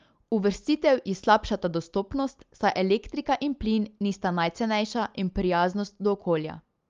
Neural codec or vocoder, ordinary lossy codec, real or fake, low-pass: none; Opus, 32 kbps; real; 7.2 kHz